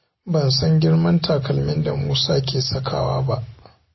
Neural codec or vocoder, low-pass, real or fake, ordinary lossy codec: none; 7.2 kHz; real; MP3, 24 kbps